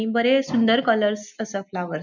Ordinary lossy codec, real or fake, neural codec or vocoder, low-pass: none; real; none; 7.2 kHz